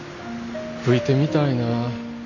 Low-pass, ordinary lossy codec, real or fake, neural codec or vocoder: 7.2 kHz; none; real; none